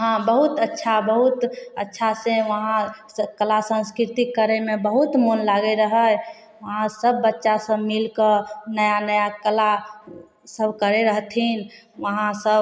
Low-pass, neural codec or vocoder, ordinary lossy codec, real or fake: none; none; none; real